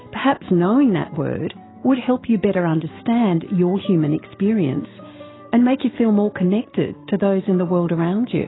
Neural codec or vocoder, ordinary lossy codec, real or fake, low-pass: autoencoder, 48 kHz, 128 numbers a frame, DAC-VAE, trained on Japanese speech; AAC, 16 kbps; fake; 7.2 kHz